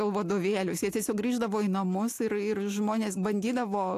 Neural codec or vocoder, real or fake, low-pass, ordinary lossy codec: none; real; 14.4 kHz; AAC, 64 kbps